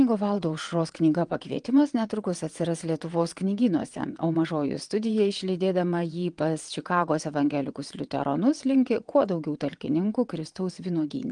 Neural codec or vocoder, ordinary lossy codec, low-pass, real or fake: vocoder, 22.05 kHz, 80 mel bands, WaveNeXt; Opus, 24 kbps; 9.9 kHz; fake